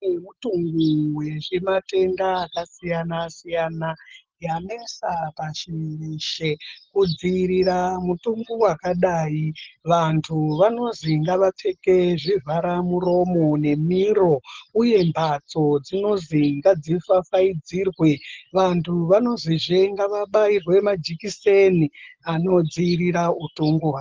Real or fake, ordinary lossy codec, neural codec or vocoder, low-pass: real; Opus, 16 kbps; none; 7.2 kHz